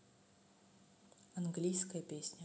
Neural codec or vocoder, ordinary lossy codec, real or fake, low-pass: none; none; real; none